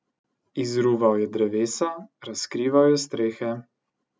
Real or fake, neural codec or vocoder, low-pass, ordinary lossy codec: real; none; none; none